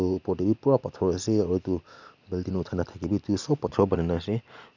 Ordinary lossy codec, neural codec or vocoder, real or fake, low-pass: none; none; real; 7.2 kHz